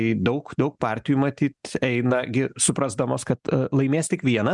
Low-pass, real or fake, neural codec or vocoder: 10.8 kHz; real; none